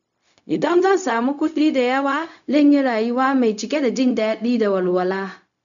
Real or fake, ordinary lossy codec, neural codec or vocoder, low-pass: fake; none; codec, 16 kHz, 0.4 kbps, LongCat-Audio-Codec; 7.2 kHz